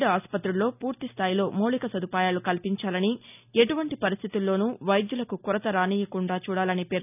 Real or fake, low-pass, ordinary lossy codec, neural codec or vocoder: real; 3.6 kHz; none; none